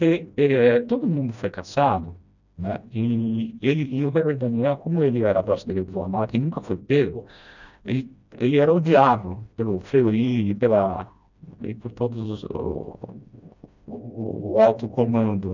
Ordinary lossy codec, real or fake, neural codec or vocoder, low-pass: none; fake; codec, 16 kHz, 1 kbps, FreqCodec, smaller model; 7.2 kHz